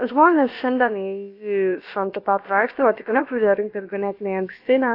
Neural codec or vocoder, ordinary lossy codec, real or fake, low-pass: codec, 16 kHz, about 1 kbps, DyCAST, with the encoder's durations; AAC, 32 kbps; fake; 5.4 kHz